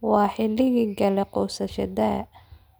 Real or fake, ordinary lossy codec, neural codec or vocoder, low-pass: real; none; none; none